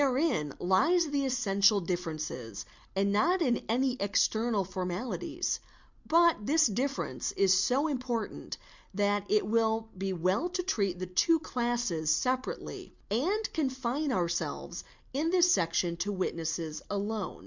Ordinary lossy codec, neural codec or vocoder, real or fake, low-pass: Opus, 64 kbps; none; real; 7.2 kHz